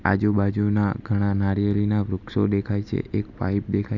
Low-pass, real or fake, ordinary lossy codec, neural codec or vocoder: 7.2 kHz; real; none; none